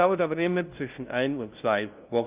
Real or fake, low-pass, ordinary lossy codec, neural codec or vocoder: fake; 3.6 kHz; Opus, 24 kbps; codec, 16 kHz, 0.5 kbps, FunCodec, trained on LibriTTS, 25 frames a second